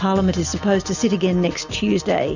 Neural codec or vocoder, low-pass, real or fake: none; 7.2 kHz; real